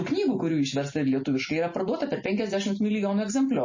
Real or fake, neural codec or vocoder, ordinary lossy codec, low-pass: real; none; MP3, 32 kbps; 7.2 kHz